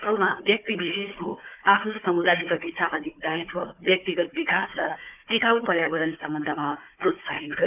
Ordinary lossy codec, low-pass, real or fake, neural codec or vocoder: none; 3.6 kHz; fake; codec, 16 kHz, 4 kbps, FunCodec, trained on Chinese and English, 50 frames a second